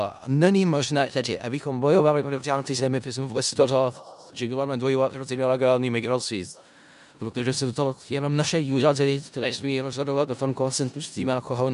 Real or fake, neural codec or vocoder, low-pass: fake; codec, 16 kHz in and 24 kHz out, 0.4 kbps, LongCat-Audio-Codec, four codebook decoder; 10.8 kHz